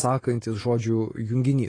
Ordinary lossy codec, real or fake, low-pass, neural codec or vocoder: AAC, 32 kbps; real; 9.9 kHz; none